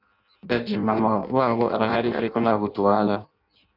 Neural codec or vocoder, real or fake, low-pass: codec, 16 kHz in and 24 kHz out, 0.6 kbps, FireRedTTS-2 codec; fake; 5.4 kHz